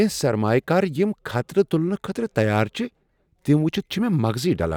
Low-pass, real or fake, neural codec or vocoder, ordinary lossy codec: 19.8 kHz; real; none; none